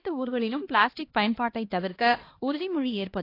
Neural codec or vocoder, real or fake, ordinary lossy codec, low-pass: codec, 16 kHz, 1 kbps, X-Codec, HuBERT features, trained on LibriSpeech; fake; AAC, 32 kbps; 5.4 kHz